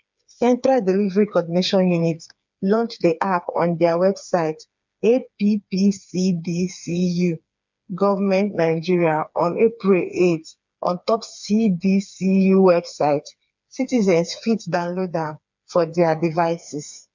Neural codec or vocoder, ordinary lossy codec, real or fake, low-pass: codec, 16 kHz, 4 kbps, FreqCodec, smaller model; MP3, 64 kbps; fake; 7.2 kHz